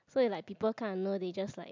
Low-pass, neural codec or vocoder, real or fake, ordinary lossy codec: 7.2 kHz; none; real; MP3, 64 kbps